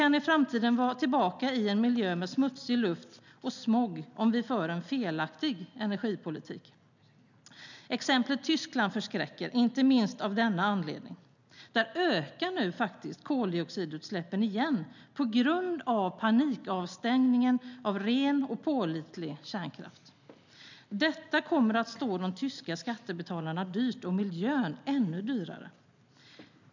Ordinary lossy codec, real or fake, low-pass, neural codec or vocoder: none; real; 7.2 kHz; none